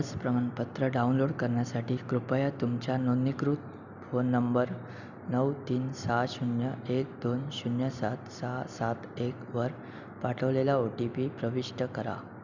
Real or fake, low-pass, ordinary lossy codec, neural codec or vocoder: real; 7.2 kHz; none; none